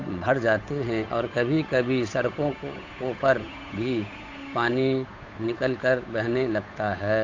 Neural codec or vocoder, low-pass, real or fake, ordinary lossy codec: codec, 16 kHz, 8 kbps, FunCodec, trained on Chinese and English, 25 frames a second; 7.2 kHz; fake; AAC, 48 kbps